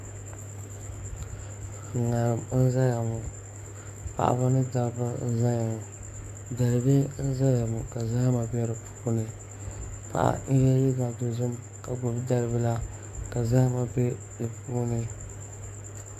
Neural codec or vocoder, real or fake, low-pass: codec, 44.1 kHz, 7.8 kbps, DAC; fake; 14.4 kHz